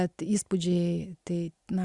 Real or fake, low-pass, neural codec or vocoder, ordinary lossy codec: real; 10.8 kHz; none; Opus, 64 kbps